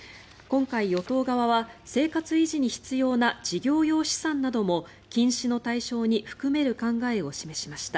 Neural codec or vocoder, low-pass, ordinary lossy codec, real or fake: none; none; none; real